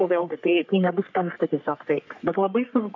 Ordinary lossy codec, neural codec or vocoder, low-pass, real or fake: MP3, 64 kbps; codec, 44.1 kHz, 3.4 kbps, Pupu-Codec; 7.2 kHz; fake